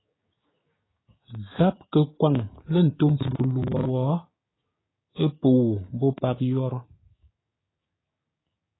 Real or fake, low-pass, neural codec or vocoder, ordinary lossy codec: fake; 7.2 kHz; codec, 16 kHz, 6 kbps, DAC; AAC, 16 kbps